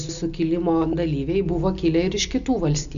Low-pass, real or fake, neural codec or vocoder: 7.2 kHz; real; none